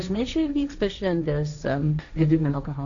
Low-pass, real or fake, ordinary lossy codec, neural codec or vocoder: 7.2 kHz; fake; AAC, 64 kbps; codec, 16 kHz, 1.1 kbps, Voila-Tokenizer